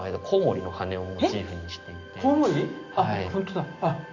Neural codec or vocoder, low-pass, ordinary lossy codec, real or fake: none; 7.2 kHz; Opus, 64 kbps; real